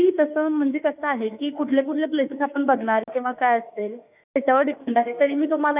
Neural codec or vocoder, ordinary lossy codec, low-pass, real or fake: autoencoder, 48 kHz, 32 numbers a frame, DAC-VAE, trained on Japanese speech; none; 3.6 kHz; fake